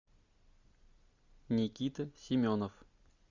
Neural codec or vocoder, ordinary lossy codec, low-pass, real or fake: none; Opus, 64 kbps; 7.2 kHz; real